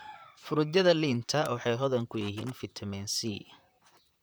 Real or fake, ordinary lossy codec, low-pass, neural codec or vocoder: fake; none; none; vocoder, 44.1 kHz, 128 mel bands, Pupu-Vocoder